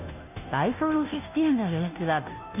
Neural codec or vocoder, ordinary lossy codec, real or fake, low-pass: codec, 16 kHz, 0.5 kbps, FunCodec, trained on Chinese and English, 25 frames a second; none; fake; 3.6 kHz